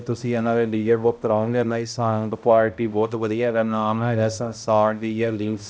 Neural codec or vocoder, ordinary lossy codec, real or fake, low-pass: codec, 16 kHz, 0.5 kbps, X-Codec, HuBERT features, trained on balanced general audio; none; fake; none